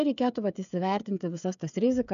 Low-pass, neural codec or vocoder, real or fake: 7.2 kHz; codec, 16 kHz, 8 kbps, FreqCodec, smaller model; fake